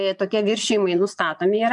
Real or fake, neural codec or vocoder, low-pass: fake; vocoder, 24 kHz, 100 mel bands, Vocos; 10.8 kHz